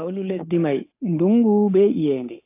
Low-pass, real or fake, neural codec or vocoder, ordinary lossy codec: 3.6 kHz; fake; codec, 16 kHz, 8 kbps, FunCodec, trained on Chinese and English, 25 frames a second; AAC, 24 kbps